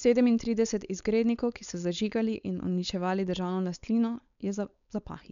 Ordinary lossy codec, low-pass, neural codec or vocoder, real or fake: none; 7.2 kHz; codec, 16 kHz, 4.8 kbps, FACodec; fake